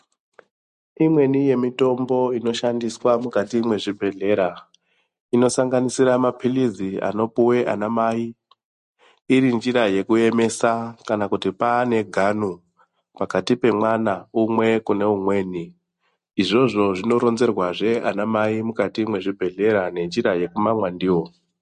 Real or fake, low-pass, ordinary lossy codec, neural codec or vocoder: real; 14.4 kHz; MP3, 48 kbps; none